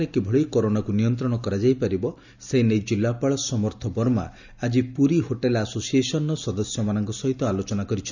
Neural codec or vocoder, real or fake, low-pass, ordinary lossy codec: none; real; 7.2 kHz; none